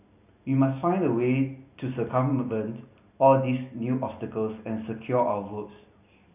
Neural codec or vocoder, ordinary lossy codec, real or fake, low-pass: none; none; real; 3.6 kHz